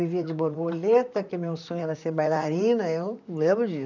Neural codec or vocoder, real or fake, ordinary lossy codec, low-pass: vocoder, 44.1 kHz, 128 mel bands, Pupu-Vocoder; fake; none; 7.2 kHz